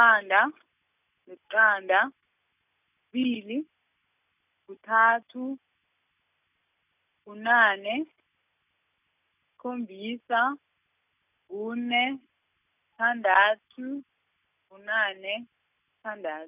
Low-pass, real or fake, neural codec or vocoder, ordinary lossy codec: 3.6 kHz; real; none; none